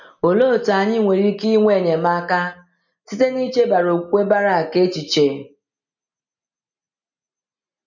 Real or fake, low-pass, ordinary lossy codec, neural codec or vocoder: real; 7.2 kHz; none; none